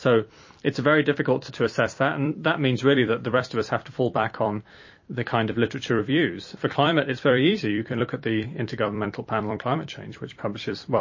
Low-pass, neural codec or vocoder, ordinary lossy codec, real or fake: 7.2 kHz; vocoder, 44.1 kHz, 128 mel bands every 256 samples, BigVGAN v2; MP3, 32 kbps; fake